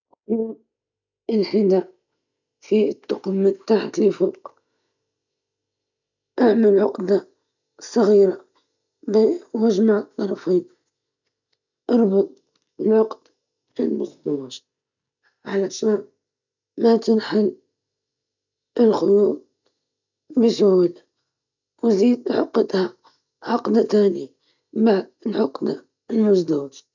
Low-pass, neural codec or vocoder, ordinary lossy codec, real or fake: 7.2 kHz; none; none; real